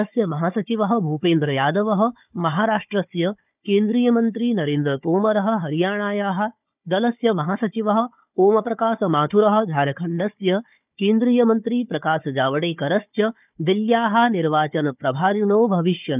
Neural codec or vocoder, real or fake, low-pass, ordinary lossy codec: codec, 16 kHz, 4 kbps, FunCodec, trained on Chinese and English, 50 frames a second; fake; 3.6 kHz; none